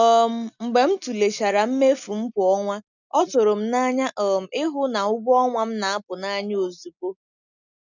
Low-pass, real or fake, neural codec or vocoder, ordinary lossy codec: 7.2 kHz; real; none; none